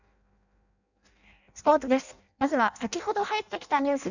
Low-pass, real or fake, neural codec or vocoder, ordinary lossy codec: 7.2 kHz; fake; codec, 16 kHz in and 24 kHz out, 0.6 kbps, FireRedTTS-2 codec; none